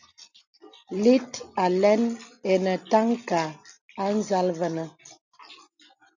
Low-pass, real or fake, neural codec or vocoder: 7.2 kHz; real; none